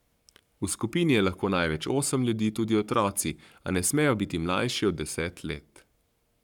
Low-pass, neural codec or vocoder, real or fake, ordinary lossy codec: 19.8 kHz; codec, 44.1 kHz, 7.8 kbps, Pupu-Codec; fake; none